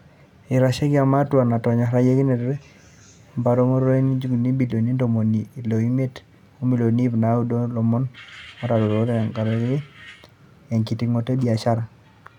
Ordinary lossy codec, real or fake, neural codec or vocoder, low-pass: none; real; none; 19.8 kHz